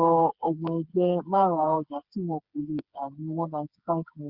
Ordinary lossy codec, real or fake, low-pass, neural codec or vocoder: Opus, 64 kbps; fake; 5.4 kHz; codec, 16 kHz, 4 kbps, FreqCodec, smaller model